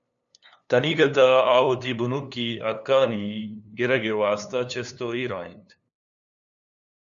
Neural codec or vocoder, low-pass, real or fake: codec, 16 kHz, 2 kbps, FunCodec, trained on LibriTTS, 25 frames a second; 7.2 kHz; fake